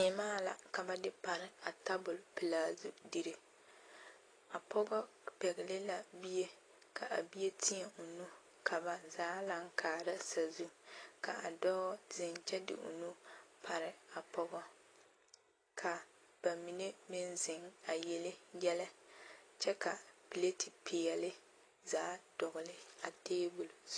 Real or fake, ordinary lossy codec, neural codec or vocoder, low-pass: real; AAC, 32 kbps; none; 9.9 kHz